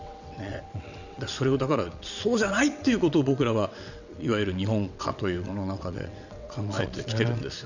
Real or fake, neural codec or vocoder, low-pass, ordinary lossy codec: fake; vocoder, 22.05 kHz, 80 mel bands, WaveNeXt; 7.2 kHz; none